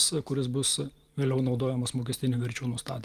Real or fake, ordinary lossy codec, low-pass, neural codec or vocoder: real; Opus, 32 kbps; 14.4 kHz; none